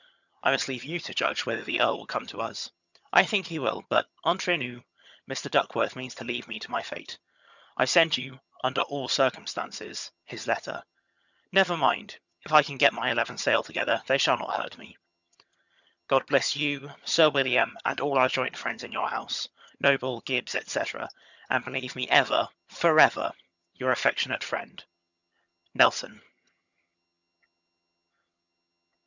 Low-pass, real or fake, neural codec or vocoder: 7.2 kHz; fake; vocoder, 22.05 kHz, 80 mel bands, HiFi-GAN